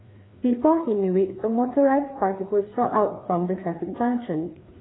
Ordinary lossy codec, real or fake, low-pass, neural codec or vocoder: AAC, 16 kbps; fake; 7.2 kHz; codec, 16 kHz, 2 kbps, FreqCodec, larger model